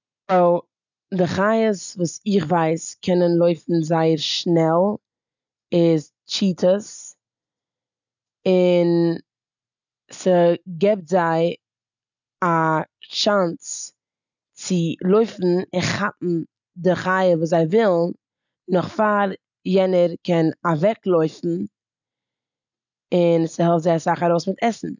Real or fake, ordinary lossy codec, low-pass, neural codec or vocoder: real; none; 7.2 kHz; none